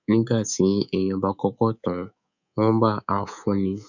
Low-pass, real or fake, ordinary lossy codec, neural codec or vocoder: 7.2 kHz; fake; none; codec, 24 kHz, 3.1 kbps, DualCodec